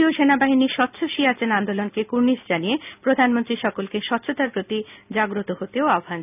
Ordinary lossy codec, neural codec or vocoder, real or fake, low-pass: none; none; real; 3.6 kHz